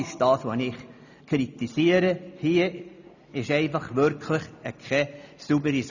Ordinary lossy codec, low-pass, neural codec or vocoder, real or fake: none; 7.2 kHz; none; real